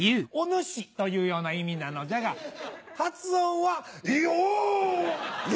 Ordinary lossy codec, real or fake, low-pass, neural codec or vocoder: none; real; none; none